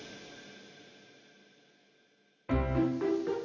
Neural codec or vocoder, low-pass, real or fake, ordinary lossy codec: none; 7.2 kHz; real; none